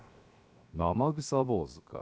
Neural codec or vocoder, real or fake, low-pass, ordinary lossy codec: codec, 16 kHz, 0.7 kbps, FocalCodec; fake; none; none